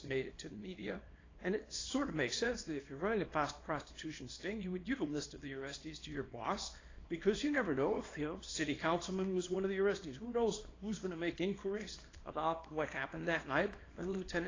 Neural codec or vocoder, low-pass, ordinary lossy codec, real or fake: codec, 24 kHz, 0.9 kbps, WavTokenizer, small release; 7.2 kHz; AAC, 32 kbps; fake